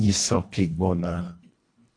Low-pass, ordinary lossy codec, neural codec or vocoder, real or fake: 9.9 kHz; AAC, 48 kbps; codec, 24 kHz, 1.5 kbps, HILCodec; fake